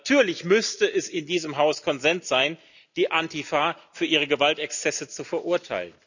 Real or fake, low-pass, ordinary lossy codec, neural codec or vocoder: real; 7.2 kHz; none; none